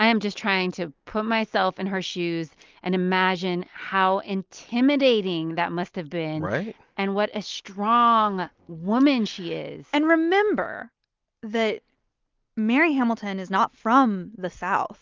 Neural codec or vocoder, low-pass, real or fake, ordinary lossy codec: none; 7.2 kHz; real; Opus, 32 kbps